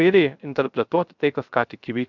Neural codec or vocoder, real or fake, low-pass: codec, 16 kHz, 0.3 kbps, FocalCodec; fake; 7.2 kHz